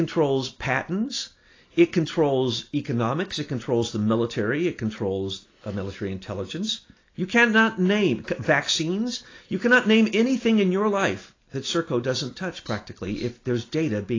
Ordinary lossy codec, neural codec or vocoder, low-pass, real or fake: AAC, 32 kbps; none; 7.2 kHz; real